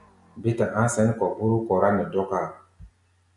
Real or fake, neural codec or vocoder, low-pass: real; none; 10.8 kHz